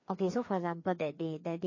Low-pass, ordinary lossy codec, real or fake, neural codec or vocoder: 7.2 kHz; MP3, 32 kbps; fake; codec, 16 kHz, 2 kbps, FreqCodec, larger model